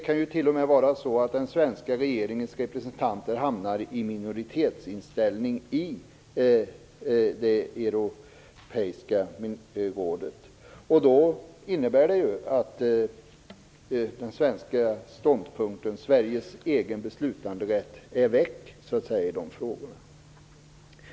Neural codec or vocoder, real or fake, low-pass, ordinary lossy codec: none; real; none; none